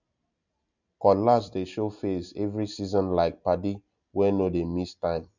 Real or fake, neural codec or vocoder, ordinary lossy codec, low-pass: real; none; none; 7.2 kHz